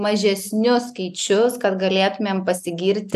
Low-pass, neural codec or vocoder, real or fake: 14.4 kHz; none; real